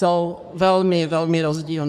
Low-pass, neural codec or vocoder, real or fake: 14.4 kHz; codec, 44.1 kHz, 3.4 kbps, Pupu-Codec; fake